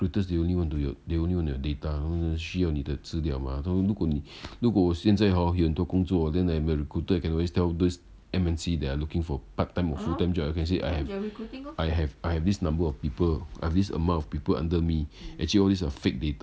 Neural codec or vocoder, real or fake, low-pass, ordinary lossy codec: none; real; none; none